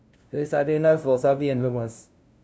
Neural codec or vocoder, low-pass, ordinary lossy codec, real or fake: codec, 16 kHz, 0.5 kbps, FunCodec, trained on LibriTTS, 25 frames a second; none; none; fake